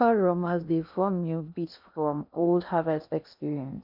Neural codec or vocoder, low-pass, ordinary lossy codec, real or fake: codec, 16 kHz in and 24 kHz out, 0.6 kbps, FocalCodec, streaming, 2048 codes; 5.4 kHz; none; fake